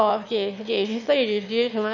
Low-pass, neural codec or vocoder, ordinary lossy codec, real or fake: 7.2 kHz; autoencoder, 22.05 kHz, a latent of 192 numbers a frame, VITS, trained on one speaker; none; fake